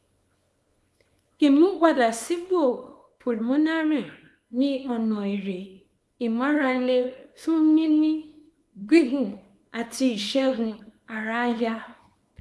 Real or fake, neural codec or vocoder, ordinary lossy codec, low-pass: fake; codec, 24 kHz, 0.9 kbps, WavTokenizer, small release; none; none